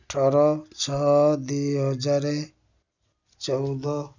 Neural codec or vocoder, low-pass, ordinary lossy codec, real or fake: none; 7.2 kHz; none; real